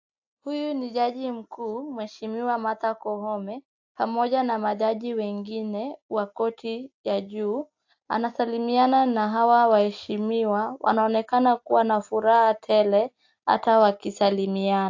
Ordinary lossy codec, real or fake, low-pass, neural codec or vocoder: AAC, 48 kbps; real; 7.2 kHz; none